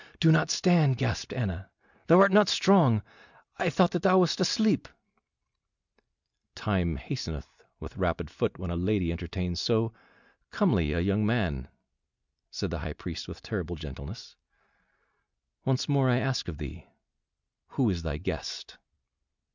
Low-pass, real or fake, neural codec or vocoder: 7.2 kHz; real; none